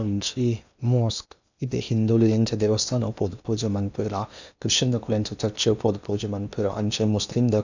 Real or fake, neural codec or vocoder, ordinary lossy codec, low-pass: fake; codec, 16 kHz in and 24 kHz out, 0.8 kbps, FocalCodec, streaming, 65536 codes; none; 7.2 kHz